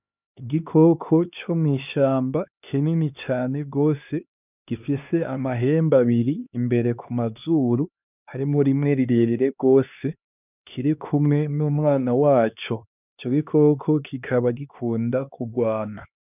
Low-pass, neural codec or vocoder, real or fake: 3.6 kHz; codec, 16 kHz, 2 kbps, X-Codec, HuBERT features, trained on LibriSpeech; fake